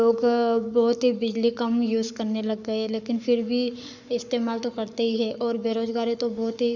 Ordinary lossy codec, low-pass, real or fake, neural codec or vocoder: none; 7.2 kHz; fake; codec, 44.1 kHz, 7.8 kbps, Pupu-Codec